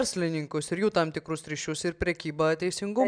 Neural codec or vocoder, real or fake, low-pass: none; real; 9.9 kHz